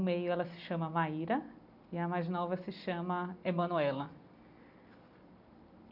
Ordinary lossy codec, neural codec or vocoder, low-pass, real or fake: none; none; 5.4 kHz; real